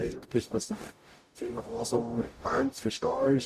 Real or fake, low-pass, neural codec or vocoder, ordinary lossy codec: fake; 14.4 kHz; codec, 44.1 kHz, 0.9 kbps, DAC; Opus, 64 kbps